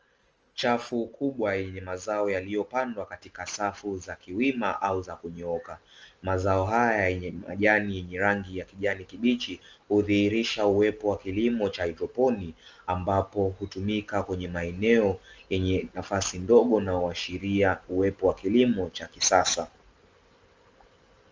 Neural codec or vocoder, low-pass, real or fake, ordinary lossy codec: none; 7.2 kHz; real; Opus, 24 kbps